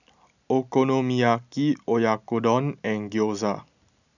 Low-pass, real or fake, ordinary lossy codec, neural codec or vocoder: 7.2 kHz; real; none; none